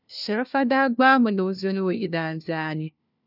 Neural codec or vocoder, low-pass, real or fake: codec, 16 kHz, 1 kbps, FunCodec, trained on LibriTTS, 50 frames a second; 5.4 kHz; fake